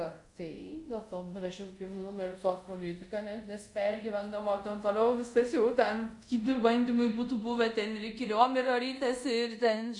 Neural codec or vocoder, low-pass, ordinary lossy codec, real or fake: codec, 24 kHz, 0.5 kbps, DualCodec; 10.8 kHz; MP3, 96 kbps; fake